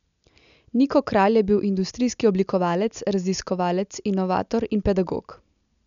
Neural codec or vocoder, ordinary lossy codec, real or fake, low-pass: none; none; real; 7.2 kHz